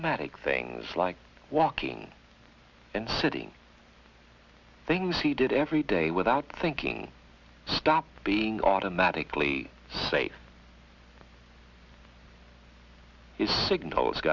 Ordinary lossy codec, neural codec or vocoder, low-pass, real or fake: Opus, 64 kbps; none; 7.2 kHz; real